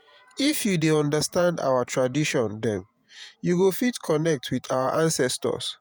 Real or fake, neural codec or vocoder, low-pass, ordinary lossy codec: fake; vocoder, 48 kHz, 128 mel bands, Vocos; none; none